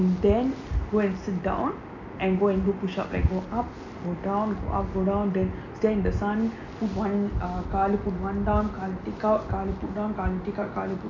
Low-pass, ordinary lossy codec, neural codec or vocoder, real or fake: 7.2 kHz; none; none; real